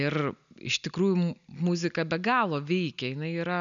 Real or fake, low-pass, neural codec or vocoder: real; 7.2 kHz; none